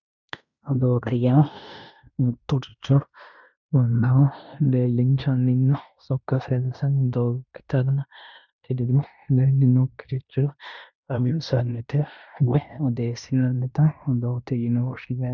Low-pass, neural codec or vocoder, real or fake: 7.2 kHz; codec, 16 kHz in and 24 kHz out, 0.9 kbps, LongCat-Audio-Codec, fine tuned four codebook decoder; fake